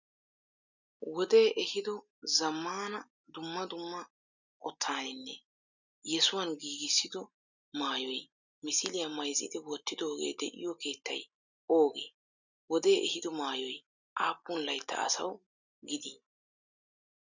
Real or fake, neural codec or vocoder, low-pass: real; none; 7.2 kHz